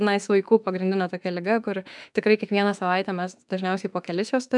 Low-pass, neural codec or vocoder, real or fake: 10.8 kHz; autoencoder, 48 kHz, 32 numbers a frame, DAC-VAE, trained on Japanese speech; fake